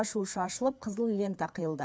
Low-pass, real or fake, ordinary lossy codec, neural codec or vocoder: none; fake; none; codec, 16 kHz, 4 kbps, FreqCodec, larger model